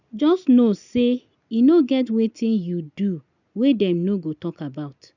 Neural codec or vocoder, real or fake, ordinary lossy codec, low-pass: none; real; none; 7.2 kHz